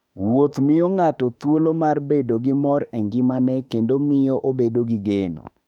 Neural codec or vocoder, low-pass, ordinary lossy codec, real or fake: autoencoder, 48 kHz, 32 numbers a frame, DAC-VAE, trained on Japanese speech; 19.8 kHz; none; fake